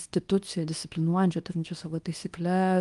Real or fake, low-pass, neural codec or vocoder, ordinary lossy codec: fake; 10.8 kHz; codec, 24 kHz, 0.9 kbps, WavTokenizer, small release; Opus, 32 kbps